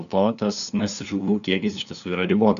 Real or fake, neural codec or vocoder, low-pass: fake; codec, 16 kHz, 2 kbps, FunCodec, trained on LibriTTS, 25 frames a second; 7.2 kHz